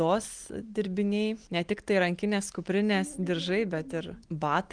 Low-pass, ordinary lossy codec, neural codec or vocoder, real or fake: 9.9 kHz; Opus, 24 kbps; none; real